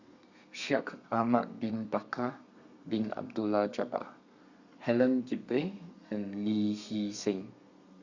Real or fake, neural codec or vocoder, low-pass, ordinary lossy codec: fake; codec, 32 kHz, 1.9 kbps, SNAC; 7.2 kHz; Opus, 64 kbps